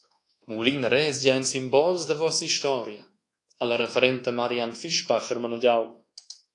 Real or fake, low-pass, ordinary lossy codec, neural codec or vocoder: fake; 10.8 kHz; AAC, 48 kbps; codec, 24 kHz, 1.2 kbps, DualCodec